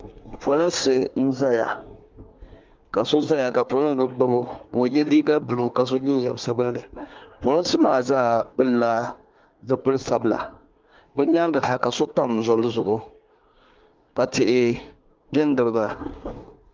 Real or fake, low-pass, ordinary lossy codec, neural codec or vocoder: fake; 7.2 kHz; Opus, 32 kbps; codec, 24 kHz, 1 kbps, SNAC